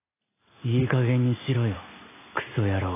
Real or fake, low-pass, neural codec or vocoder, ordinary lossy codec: real; 3.6 kHz; none; AAC, 24 kbps